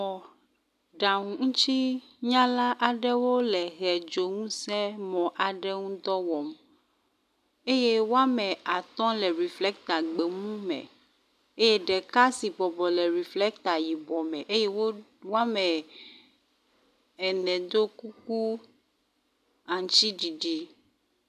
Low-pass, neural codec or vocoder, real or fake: 14.4 kHz; none; real